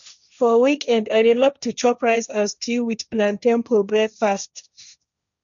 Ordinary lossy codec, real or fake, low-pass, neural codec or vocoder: none; fake; 7.2 kHz; codec, 16 kHz, 1.1 kbps, Voila-Tokenizer